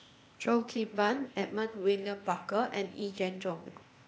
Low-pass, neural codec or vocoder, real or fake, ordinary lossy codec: none; codec, 16 kHz, 0.8 kbps, ZipCodec; fake; none